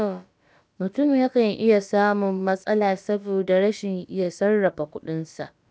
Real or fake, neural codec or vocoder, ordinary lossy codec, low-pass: fake; codec, 16 kHz, about 1 kbps, DyCAST, with the encoder's durations; none; none